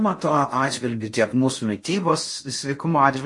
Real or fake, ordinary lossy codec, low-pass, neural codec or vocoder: fake; MP3, 48 kbps; 10.8 kHz; codec, 16 kHz in and 24 kHz out, 0.6 kbps, FocalCodec, streaming, 4096 codes